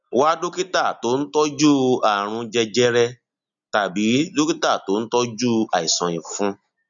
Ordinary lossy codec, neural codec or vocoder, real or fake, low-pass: none; none; real; 7.2 kHz